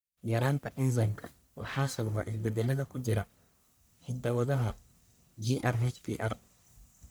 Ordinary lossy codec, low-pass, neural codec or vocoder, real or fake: none; none; codec, 44.1 kHz, 1.7 kbps, Pupu-Codec; fake